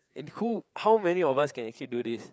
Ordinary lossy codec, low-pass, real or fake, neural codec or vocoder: none; none; fake; codec, 16 kHz, 4 kbps, FreqCodec, larger model